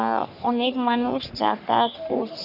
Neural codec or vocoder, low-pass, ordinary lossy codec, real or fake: codec, 44.1 kHz, 3.4 kbps, Pupu-Codec; 5.4 kHz; none; fake